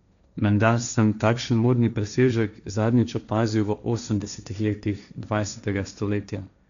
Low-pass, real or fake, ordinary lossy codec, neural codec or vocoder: 7.2 kHz; fake; none; codec, 16 kHz, 1.1 kbps, Voila-Tokenizer